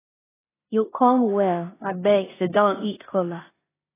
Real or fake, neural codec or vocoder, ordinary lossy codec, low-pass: fake; codec, 16 kHz in and 24 kHz out, 0.9 kbps, LongCat-Audio-Codec, four codebook decoder; AAC, 16 kbps; 3.6 kHz